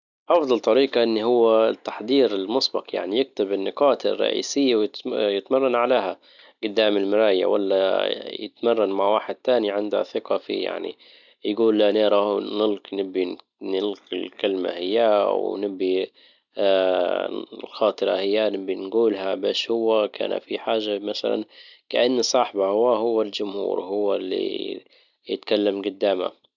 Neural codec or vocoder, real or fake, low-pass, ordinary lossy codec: none; real; 7.2 kHz; none